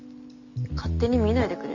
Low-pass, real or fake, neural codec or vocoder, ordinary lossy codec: 7.2 kHz; real; none; Opus, 64 kbps